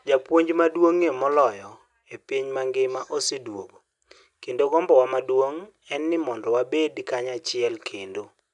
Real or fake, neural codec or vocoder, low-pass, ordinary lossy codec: real; none; 10.8 kHz; none